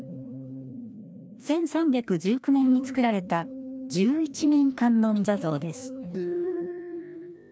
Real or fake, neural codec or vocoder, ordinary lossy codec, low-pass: fake; codec, 16 kHz, 1 kbps, FreqCodec, larger model; none; none